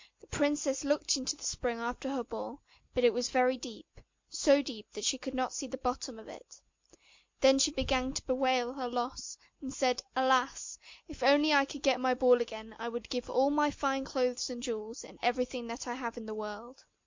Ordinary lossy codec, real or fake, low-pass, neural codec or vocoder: MP3, 48 kbps; real; 7.2 kHz; none